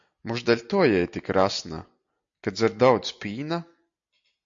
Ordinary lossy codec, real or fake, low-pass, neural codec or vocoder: AAC, 64 kbps; real; 7.2 kHz; none